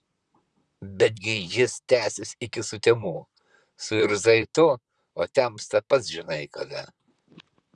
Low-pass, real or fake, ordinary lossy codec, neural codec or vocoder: 10.8 kHz; fake; Opus, 64 kbps; vocoder, 44.1 kHz, 128 mel bands, Pupu-Vocoder